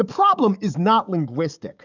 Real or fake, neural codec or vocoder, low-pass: fake; codec, 44.1 kHz, 7.8 kbps, DAC; 7.2 kHz